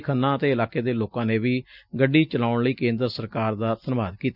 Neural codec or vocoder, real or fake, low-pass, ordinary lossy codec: none; real; 5.4 kHz; none